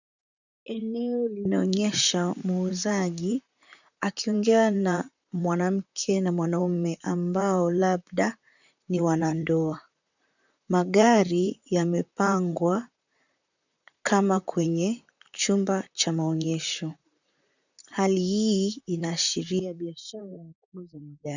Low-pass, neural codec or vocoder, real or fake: 7.2 kHz; vocoder, 44.1 kHz, 128 mel bands, Pupu-Vocoder; fake